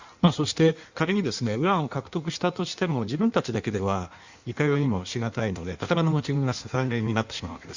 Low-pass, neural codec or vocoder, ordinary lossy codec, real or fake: 7.2 kHz; codec, 16 kHz in and 24 kHz out, 1.1 kbps, FireRedTTS-2 codec; Opus, 64 kbps; fake